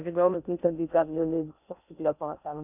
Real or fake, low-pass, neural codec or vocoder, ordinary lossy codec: fake; 3.6 kHz; codec, 16 kHz in and 24 kHz out, 0.6 kbps, FocalCodec, streaming, 2048 codes; none